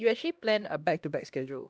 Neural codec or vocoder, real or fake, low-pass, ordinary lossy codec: codec, 16 kHz, 1 kbps, X-Codec, HuBERT features, trained on LibriSpeech; fake; none; none